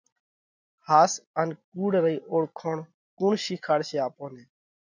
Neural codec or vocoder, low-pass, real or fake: none; 7.2 kHz; real